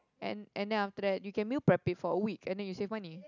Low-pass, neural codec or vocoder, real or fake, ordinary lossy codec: 7.2 kHz; none; real; none